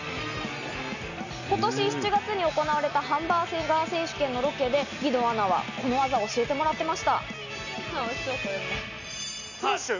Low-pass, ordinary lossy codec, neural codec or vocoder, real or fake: 7.2 kHz; none; none; real